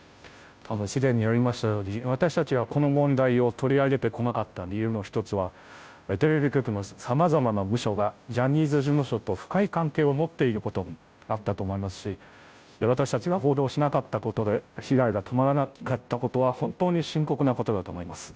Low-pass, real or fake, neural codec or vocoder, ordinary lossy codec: none; fake; codec, 16 kHz, 0.5 kbps, FunCodec, trained on Chinese and English, 25 frames a second; none